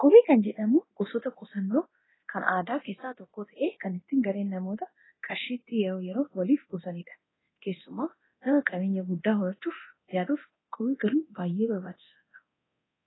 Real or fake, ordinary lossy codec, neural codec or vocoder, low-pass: fake; AAC, 16 kbps; codec, 24 kHz, 0.9 kbps, DualCodec; 7.2 kHz